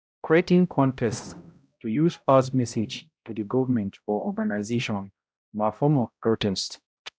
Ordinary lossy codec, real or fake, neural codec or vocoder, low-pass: none; fake; codec, 16 kHz, 0.5 kbps, X-Codec, HuBERT features, trained on balanced general audio; none